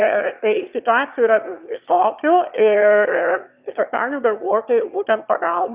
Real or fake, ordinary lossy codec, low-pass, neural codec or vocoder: fake; Opus, 64 kbps; 3.6 kHz; autoencoder, 22.05 kHz, a latent of 192 numbers a frame, VITS, trained on one speaker